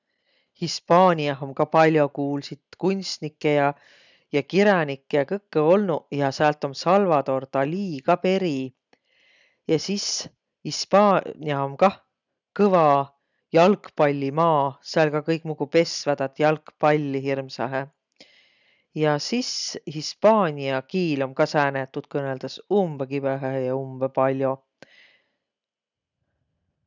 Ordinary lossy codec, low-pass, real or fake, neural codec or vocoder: none; 7.2 kHz; real; none